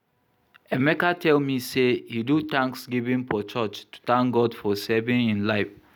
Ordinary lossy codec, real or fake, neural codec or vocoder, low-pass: none; real; none; none